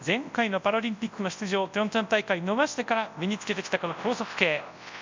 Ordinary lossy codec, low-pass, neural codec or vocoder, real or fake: MP3, 64 kbps; 7.2 kHz; codec, 24 kHz, 0.9 kbps, WavTokenizer, large speech release; fake